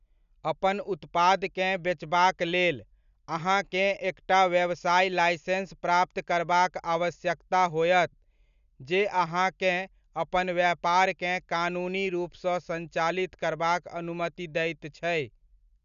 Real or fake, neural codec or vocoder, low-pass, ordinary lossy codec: real; none; 7.2 kHz; none